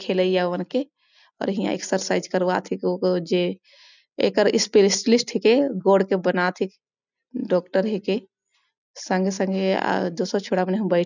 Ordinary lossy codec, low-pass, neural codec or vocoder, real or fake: none; 7.2 kHz; none; real